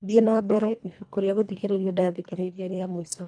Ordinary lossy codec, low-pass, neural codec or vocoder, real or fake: none; 9.9 kHz; codec, 24 kHz, 1.5 kbps, HILCodec; fake